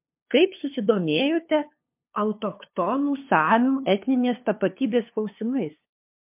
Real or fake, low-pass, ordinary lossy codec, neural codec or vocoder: fake; 3.6 kHz; MP3, 32 kbps; codec, 16 kHz, 2 kbps, FunCodec, trained on LibriTTS, 25 frames a second